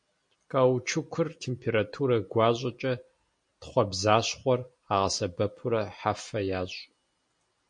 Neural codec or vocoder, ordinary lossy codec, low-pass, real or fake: none; MP3, 48 kbps; 9.9 kHz; real